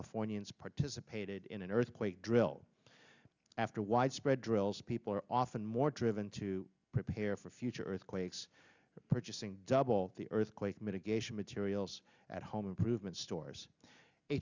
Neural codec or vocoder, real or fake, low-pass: none; real; 7.2 kHz